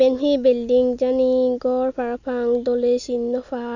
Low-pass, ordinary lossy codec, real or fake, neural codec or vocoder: 7.2 kHz; none; real; none